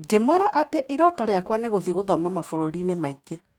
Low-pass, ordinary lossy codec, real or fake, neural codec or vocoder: 19.8 kHz; none; fake; codec, 44.1 kHz, 2.6 kbps, DAC